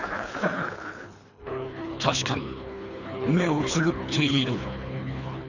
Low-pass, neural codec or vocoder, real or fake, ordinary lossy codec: 7.2 kHz; codec, 24 kHz, 3 kbps, HILCodec; fake; none